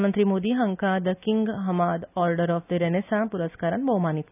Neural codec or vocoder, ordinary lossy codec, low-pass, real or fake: none; none; 3.6 kHz; real